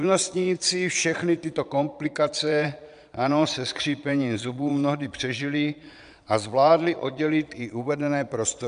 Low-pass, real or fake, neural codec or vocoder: 9.9 kHz; fake; vocoder, 22.05 kHz, 80 mel bands, Vocos